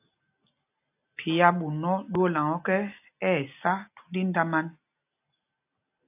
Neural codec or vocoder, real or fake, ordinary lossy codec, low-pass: none; real; AAC, 32 kbps; 3.6 kHz